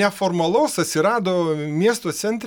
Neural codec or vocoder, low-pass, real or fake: none; 19.8 kHz; real